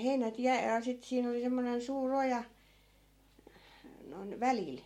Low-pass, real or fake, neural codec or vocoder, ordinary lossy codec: 19.8 kHz; real; none; MP3, 64 kbps